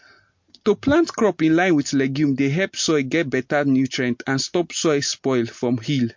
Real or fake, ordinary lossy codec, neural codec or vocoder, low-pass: real; MP3, 48 kbps; none; 7.2 kHz